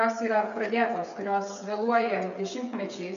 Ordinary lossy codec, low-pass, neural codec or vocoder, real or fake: MP3, 96 kbps; 7.2 kHz; codec, 16 kHz, 8 kbps, FreqCodec, smaller model; fake